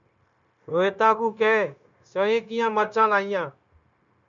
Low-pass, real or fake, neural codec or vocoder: 7.2 kHz; fake; codec, 16 kHz, 0.9 kbps, LongCat-Audio-Codec